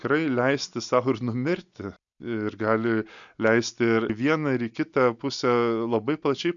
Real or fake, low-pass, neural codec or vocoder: real; 7.2 kHz; none